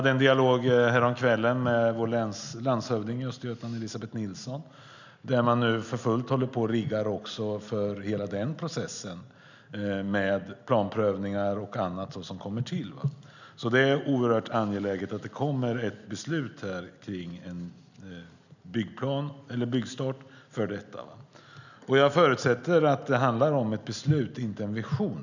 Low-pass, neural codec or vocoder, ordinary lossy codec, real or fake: 7.2 kHz; none; none; real